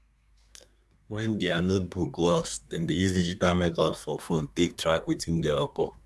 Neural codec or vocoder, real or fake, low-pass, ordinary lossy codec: codec, 24 kHz, 1 kbps, SNAC; fake; none; none